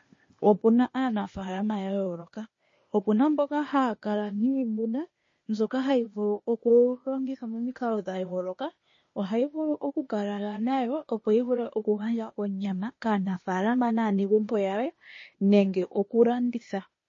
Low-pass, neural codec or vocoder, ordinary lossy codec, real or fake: 7.2 kHz; codec, 16 kHz, 0.8 kbps, ZipCodec; MP3, 32 kbps; fake